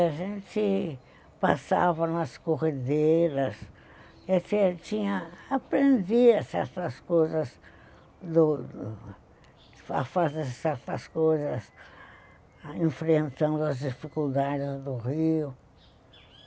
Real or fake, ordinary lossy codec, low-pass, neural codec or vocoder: real; none; none; none